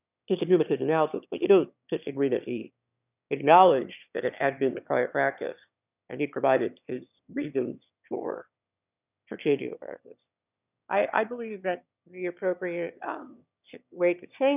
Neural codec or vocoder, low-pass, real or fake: autoencoder, 22.05 kHz, a latent of 192 numbers a frame, VITS, trained on one speaker; 3.6 kHz; fake